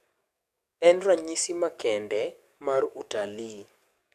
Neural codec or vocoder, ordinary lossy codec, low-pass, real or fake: codec, 44.1 kHz, 7.8 kbps, DAC; none; 14.4 kHz; fake